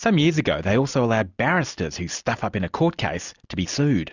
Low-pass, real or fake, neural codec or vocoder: 7.2 kHz; real; none